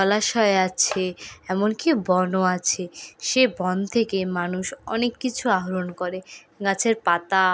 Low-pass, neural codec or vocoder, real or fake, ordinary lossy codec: none; none; real; none